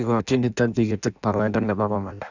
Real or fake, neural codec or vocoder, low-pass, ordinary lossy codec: fake; codec, 16 kHz in and 24 kHz out, 0.6 kbps, FireRedTTS-2 codec; 7.2 kHz; none